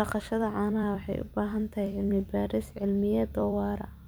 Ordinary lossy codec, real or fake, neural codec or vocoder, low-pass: none; fake; vocoder, 44.1 kHz, 128 mel bands every 256 samples, BigVGAN v2; none